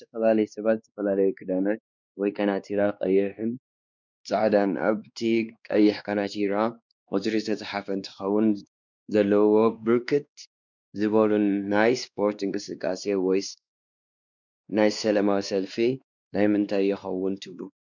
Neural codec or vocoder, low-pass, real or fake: codec, 16 kHz, 2 kbps, X-Codec, WavLM features, trained on Multilingual LibriSpeech; 7.2 kHz; fake